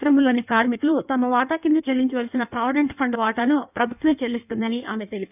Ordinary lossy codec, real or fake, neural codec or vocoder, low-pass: none; fake; codec, 16 kHz in and 24 kHz out, 1.1 kbps, FireRedTTS-2 codec; 3.6 kHz